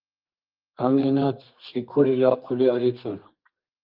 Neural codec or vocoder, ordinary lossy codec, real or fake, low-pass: codec, 32 kHz, 1.9 kbps, SNAC; Opus, 32 kbps; fake; 5.4 kHz